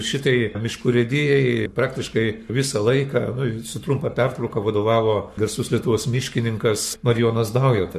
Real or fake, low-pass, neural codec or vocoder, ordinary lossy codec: fake; 14.4 kHz; codec, 44.1 kHz, 7.8 kbps, Pupu-Codec; MP3, 64 kbps